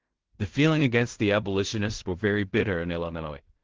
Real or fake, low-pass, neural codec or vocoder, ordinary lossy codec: fake; 7.2 kHz; codec, 16 kHz in and 24 kHz out, 0.4 kbps, LongCat-Audio-Codec, fine tuned four codebook decoder; Opus, 16 kbps